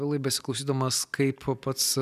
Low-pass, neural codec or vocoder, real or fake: 14.4 kHz; none; real